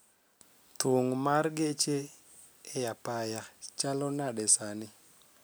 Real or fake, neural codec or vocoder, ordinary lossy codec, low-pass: real; none; none; none